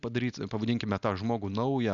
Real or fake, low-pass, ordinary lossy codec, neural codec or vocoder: real; 7.2 kHz; Opus, 64 kbps; none